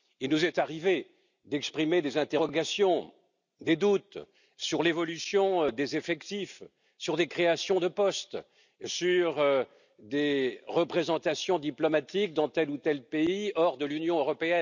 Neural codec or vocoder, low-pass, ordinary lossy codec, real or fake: none; 7.2 kHz; none; real